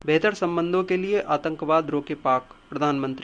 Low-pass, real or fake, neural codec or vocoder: 9.9 kHz; real; none